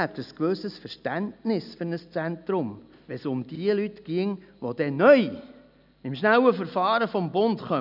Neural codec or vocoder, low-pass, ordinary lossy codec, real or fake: none; 5.4 kHz; none; real